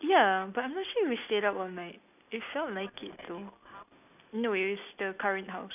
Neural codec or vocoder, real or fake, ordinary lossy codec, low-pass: none; real; none; 3.6 kHz